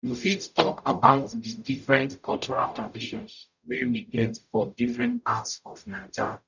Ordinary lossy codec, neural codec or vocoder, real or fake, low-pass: none; codec, 44.1 kHz, 0.9 kbps, DAC; fake; 7.2 kHz